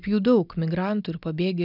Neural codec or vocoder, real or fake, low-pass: none; real; 5.4 kHz